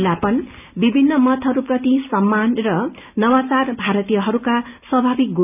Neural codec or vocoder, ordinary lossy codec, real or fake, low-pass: none; none; real; 3.6 kHz